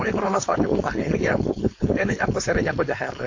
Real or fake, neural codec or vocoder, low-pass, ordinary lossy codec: fake; codec, 16 kHz, 4.8 kbps, FACodec; 7.2 kHz; AAC, 48 kbps